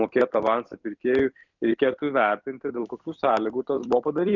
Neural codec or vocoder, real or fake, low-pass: vocoder, 44.1 kHz, 128 mel bands every 256 samples, BigVGAN v2; fake; 7.2 kHz